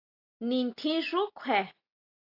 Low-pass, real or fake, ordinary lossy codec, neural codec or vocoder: 5.4 kHz; real; AAC, 32 kbps; none